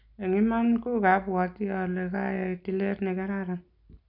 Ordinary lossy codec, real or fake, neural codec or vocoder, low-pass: none; real; none; 5.4 kHz